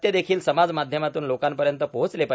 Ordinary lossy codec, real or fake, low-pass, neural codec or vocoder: none; real; none; none